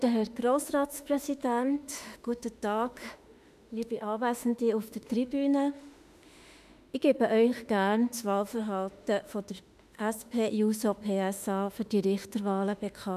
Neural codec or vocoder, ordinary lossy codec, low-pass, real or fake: autoencoder, 48 kHz, 32 numbers a frame, DAC-VAE, trained on Japanese speech; none; 14.4 kHz; fake